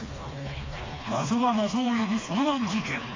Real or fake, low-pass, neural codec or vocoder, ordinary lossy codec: fake; 7.2 kHz; codec, 16 kHz, 2 kbps, FreqCodec, smaller model; AAC, 32 kbps